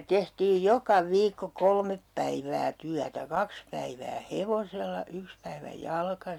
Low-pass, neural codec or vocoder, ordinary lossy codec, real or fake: 19.8 kHz; none; none; real